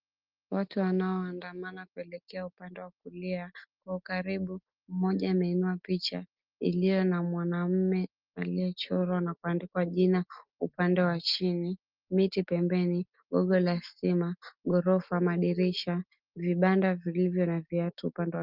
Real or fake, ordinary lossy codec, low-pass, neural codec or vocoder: real; Opus, 24 kbps; 5.4 kHz; none